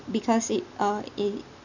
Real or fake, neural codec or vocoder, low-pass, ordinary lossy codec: real; none; 7.2 kHz; none